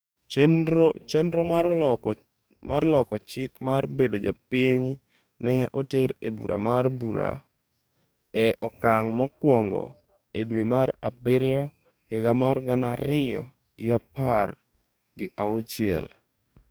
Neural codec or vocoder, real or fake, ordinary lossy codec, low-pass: codec, 44.1 kHz, 2.6 kbps, DAC; fake; none; none